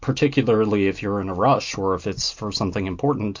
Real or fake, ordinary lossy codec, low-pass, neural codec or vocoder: real; MP3, 48 kbps; 7.2 kHz; none